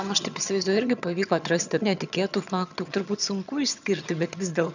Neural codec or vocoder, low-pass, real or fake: vocoder, 22.05 kHz, 80 mel bands, HiFi-GAN; 7.2 kHz; fake